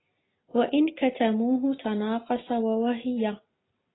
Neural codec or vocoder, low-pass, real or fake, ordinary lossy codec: none; 7.2 kHz; real; AAC, 16 kbps